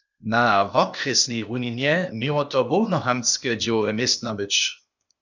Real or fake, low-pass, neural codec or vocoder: fake; 7.2 kHz; codec, 16 kHz, 0.8 kbps, ZipCodec